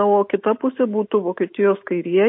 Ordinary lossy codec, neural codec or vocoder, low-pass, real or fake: MP3, 32 kbps; none; 5.4 kHz; real